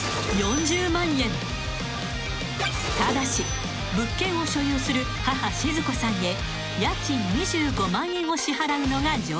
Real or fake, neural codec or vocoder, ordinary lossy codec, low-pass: real; none; none; none